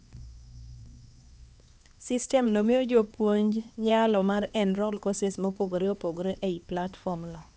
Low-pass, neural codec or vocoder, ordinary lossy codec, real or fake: none; codec, 16 kHz, 2 kbps, X-Codec, HuBERT features, trained on LibriSpeech; none; fake